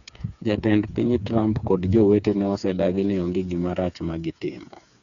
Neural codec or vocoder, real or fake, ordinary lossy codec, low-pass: codec, 16 kHz, 4 kbps, FreqCodec, smaller model; fake; none; 7.2 kHz